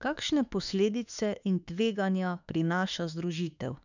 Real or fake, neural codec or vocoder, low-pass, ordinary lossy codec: fake; autoencoder, 48 kHz, 32 numbers a frame, DAC-VAE, trained on Japanese speech; 7.2 kHz; none